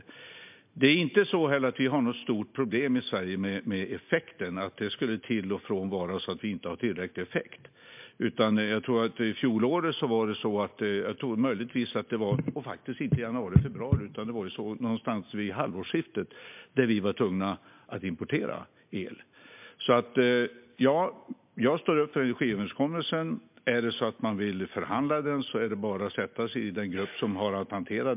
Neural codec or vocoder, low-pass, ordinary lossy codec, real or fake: none; 3.6 kHz; MP3, 32 kbps; real